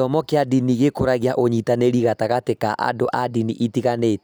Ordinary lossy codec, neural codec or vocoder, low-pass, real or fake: none; none; none; real